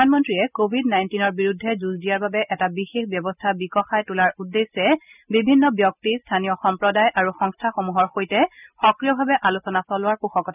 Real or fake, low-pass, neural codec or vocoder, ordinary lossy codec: real; 3.6 kHz; none; none